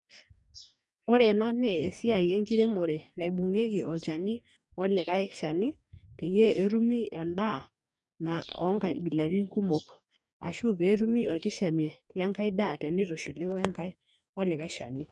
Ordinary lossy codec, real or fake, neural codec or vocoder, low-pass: none; fake; codec, 44.1 kHz, 2.6 kbps, DAC; 10.8 kHz